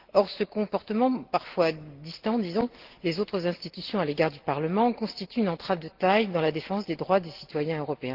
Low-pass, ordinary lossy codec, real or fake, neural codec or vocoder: 5.4 kHz; Opus, 16 kbps; real; none